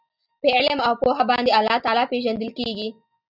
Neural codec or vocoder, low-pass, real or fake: none; 5.4 kHz; real